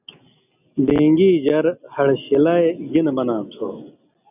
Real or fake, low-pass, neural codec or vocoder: real; 3.6 kHz; none